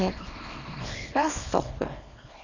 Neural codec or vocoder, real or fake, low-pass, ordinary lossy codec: codec, 24 kHz, 0.9 kbps, WavTokenizer, small release; fake; 7.2 kHz; none